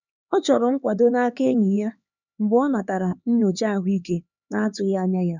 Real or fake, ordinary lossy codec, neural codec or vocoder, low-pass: fake; none; codec, 16 kHz, 4 kbps, X-Codec, HuBERT features, trained on LibriSpeech; 7.2 kHz